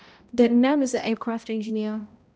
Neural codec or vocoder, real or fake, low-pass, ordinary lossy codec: codec, 16 kHz, 0.5 kbps, X-Codec, HuBERT features, trained on balanced general audio; fake; none; none